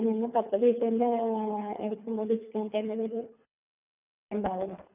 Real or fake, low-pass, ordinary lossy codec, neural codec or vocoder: fake; 3.6 kHz; none; codec, 24 kHz, 3 kbps, HILCodec